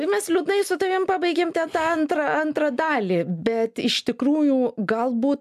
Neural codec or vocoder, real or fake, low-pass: none; real; 14.4 kHz